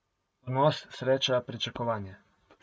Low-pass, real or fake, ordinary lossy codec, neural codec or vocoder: none; real; none; none